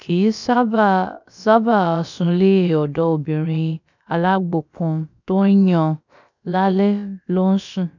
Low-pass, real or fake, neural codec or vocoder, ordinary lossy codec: 7.2 kHz; fake; codec, 16 kHz, about 1 kbps, DyCAST, with the encoder's durations; none